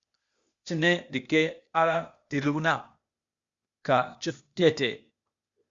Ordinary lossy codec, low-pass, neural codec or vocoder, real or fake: Opus, 64 kbps; 7.2 kHz; codec, 16 kHz, 0.8 kbps, ZipCodec; fake